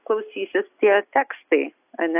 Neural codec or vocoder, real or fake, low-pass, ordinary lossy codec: none; real; 3.6 kHz; AAC, 32 kbps